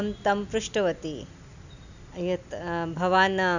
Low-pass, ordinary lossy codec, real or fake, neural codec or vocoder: 7.2 kHz; none; real; none